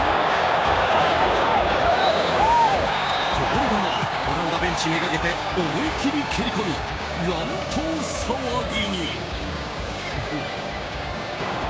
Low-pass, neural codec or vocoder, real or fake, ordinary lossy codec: none; codec, 16 kHz, 6 kbps, DAC; fake; none